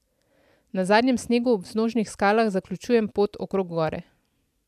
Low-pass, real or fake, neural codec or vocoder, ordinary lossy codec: 14.4 kHz; real; none; AAC, 96 kbps